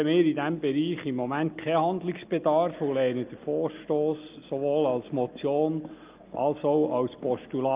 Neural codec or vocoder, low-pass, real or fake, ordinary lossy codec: none; 3.6 kHz; real; Opus, 24 kbps